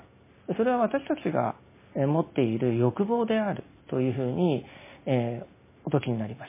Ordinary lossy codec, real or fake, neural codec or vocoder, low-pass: MP3, 16 kbps; real; none; 3.6 kHz